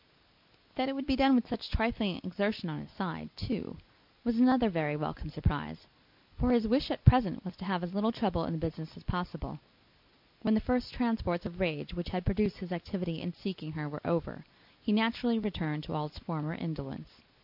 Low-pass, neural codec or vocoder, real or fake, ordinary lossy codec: 5.4 kHz; none; real; MP3, 48 kbps